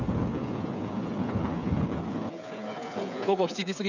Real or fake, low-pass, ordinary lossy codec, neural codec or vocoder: fake; 7.2 kHz; none; codec, 16 kHz, 8 kbps, FreqCodec, smaller model